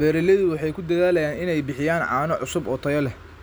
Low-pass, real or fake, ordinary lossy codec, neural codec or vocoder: none; real; none; none